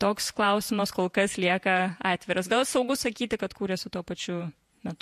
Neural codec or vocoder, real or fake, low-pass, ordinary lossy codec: vocoder, 48 kHz, 128 mel bands, Vocos; fake; 14.4 kHz; MP3, 64 kbps